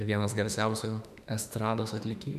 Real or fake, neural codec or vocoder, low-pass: fake; autoencoder, 48 kHz, 32 numbers a frame, DAC-VAE, trained on Japanese speech; 14.4 kHz